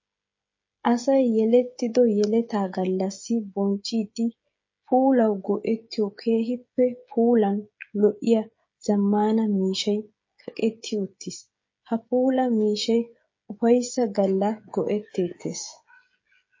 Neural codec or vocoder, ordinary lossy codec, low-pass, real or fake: codec, 16 kHz, 16 kbps, FreqCodec, smaller model; MP3, 32 kbps; 7.2 kHz; fake